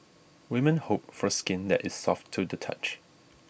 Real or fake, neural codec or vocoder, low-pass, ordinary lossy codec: real; none; none; none